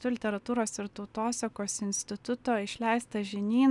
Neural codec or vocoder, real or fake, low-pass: none; real; 10.8 kHz